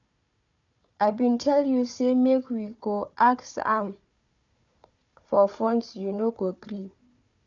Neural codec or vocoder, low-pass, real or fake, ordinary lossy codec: codec, 16 kHz, 4 kbps, FunCodec, trained on Chinese and English, 50 frames a second; 7.2 kHz; fake; none